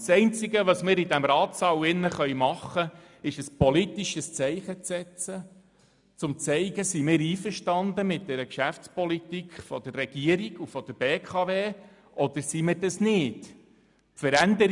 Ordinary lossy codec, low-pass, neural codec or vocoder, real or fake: none; 10.8 kHz; none; real